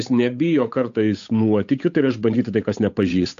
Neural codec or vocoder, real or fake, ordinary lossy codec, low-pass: codec, 16 kHz, 8 kbps, FunCodec, trained on Chinese and English, 25 frames a second; fake; AAC, 64 kbps; 7.2 kHz